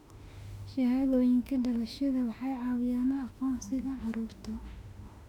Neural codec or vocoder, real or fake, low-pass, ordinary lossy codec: autoencoder, 48 kHz, 32 numbers a frame, DAC-VAE, trained on Japanese speech; fake; 19.8 kHz; none